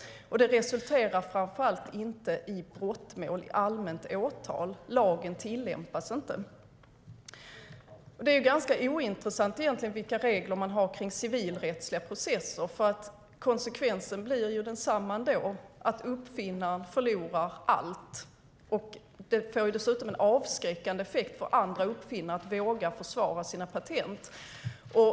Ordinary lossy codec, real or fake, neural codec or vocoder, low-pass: none; real; none; none